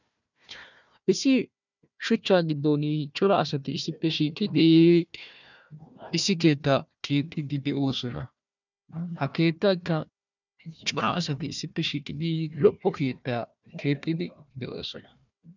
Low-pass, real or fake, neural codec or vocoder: 7.2 kHz; fake; codec, 16 kHz, 1 kbps, FunCodec, trained on Chinese and English, 50 frames a second